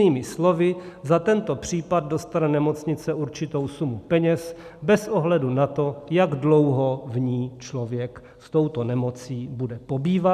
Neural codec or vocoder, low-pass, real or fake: none; 14.4 kHz; real